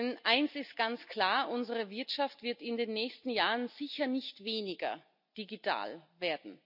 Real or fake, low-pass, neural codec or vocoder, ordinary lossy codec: real; 5.4 kHz; none; none